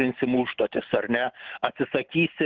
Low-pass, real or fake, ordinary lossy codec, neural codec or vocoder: 7.2 kHz; real; Opus, 16 kbps; none